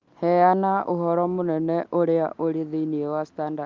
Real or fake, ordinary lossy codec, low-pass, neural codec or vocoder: real; Opus, 32 kbps; 7.2 kHz; none